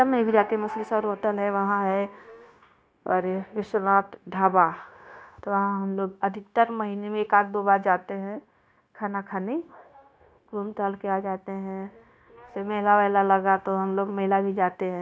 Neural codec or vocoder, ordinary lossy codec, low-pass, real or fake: codec, 16 kHz, 0.9 kbps, LongCat-Audio-Codec; none; none; fake